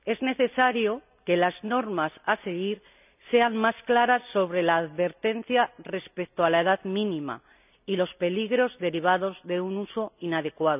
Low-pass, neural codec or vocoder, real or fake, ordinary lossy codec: 3.6 kHz; none; real; none